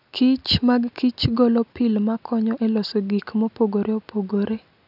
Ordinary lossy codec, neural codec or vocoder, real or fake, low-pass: none; none; real; 5.4 kHz